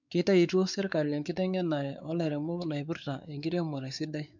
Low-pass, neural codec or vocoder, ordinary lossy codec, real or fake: 7.2 kHz; codec, 16 kHz, 4 kbps, X-Codec, WavLM features, trained on Multilingual LibriSpeech; none; fake